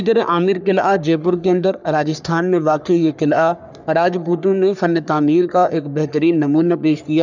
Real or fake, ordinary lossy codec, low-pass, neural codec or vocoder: fake; none; 7.2 kHz; codec, 44.1 kHz, 3.4 kbps, Pupu-Codec